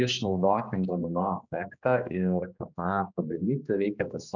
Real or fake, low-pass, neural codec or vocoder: fake; 7.2 kHz; codec, 16 kHz, 2 kbps, X-Codec, HuBERT features, trained on general audio